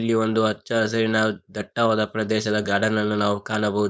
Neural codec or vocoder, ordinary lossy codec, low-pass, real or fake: codec, 16 kHz, 4.8 kbps, FACodec; none; none; fake